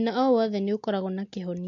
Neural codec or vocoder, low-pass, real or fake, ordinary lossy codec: none; 7.2 kHz; real; AAC, 48 kbps